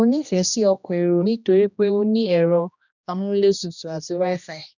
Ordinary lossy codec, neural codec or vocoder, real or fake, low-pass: none; codec, 16 kHz, 1 kbps, X-Codec, HuBERT features, trained on general audio; fake; 7.2 kHz